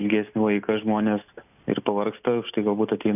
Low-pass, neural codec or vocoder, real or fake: 3.6 kHz; none; real